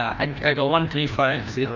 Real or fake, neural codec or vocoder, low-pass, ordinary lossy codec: fake; codec, 16 kHz, 1 kbps, FreqCodec, larger model; 7.2 kHz; none